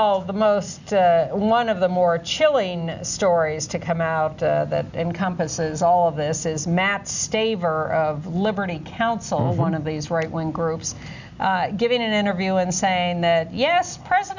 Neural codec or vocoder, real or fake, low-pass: none; real; 7.2 kHz